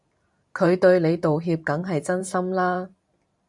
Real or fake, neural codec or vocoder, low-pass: fake; vocoder, 44.1 kHz, 128 mel bands every 512 samples, BigVGAN v2; 10.8 kHz